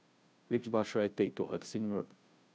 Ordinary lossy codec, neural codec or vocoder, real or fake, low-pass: none; codec, 16 kHz, 0.5 kbps, FunCodec, trained on Chinese and English, 25 frames a second; fake; none